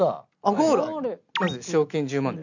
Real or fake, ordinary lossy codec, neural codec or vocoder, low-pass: real; none; none; 7.2 kHz